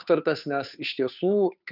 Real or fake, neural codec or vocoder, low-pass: fake; codec, 16 kHz, 2 kbps, FunCodec, trained on Chinese and English, 25 frames a second; 5.4 kHz